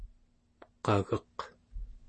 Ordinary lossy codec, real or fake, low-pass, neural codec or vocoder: MP3, 32 kbps; real; 10.8 kHz; none